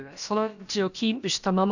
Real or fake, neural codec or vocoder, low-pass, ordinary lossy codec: fake; codec, 16 kHz, about 1 kbps, DyCAST, with the encoder's durations; 7.2 kHz; none